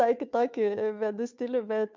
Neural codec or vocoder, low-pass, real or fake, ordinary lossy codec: codec, 16 kHz, 2 kbps, FunCodec, trained on Chinese and English, 25 frames a second; 7.2 kHz; fake; MP3, 64 kbps